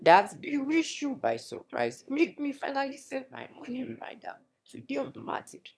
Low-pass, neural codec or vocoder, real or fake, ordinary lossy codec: none; autoencoder, 22.05 kHz, a latent of 192 numbers a frame, VITS, trained on one speaker; fake; none